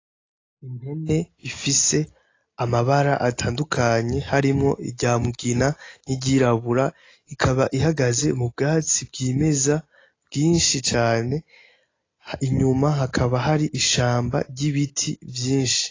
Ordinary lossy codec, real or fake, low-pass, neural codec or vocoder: AAC, 32 kbps; real; 7.2 kHz; none